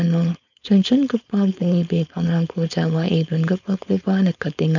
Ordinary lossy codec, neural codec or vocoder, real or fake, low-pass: none; codec, 16 kHz, 4.8 kbps, FACodec; fake; 7.2 kHz